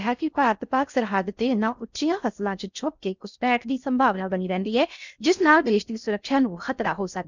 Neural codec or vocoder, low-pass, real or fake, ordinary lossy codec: codec, 16 kHz in and 24 kHz out, 0.6 kbps, FocalCodec, streaming, 4096 codes; 7.2 kHz; fake; none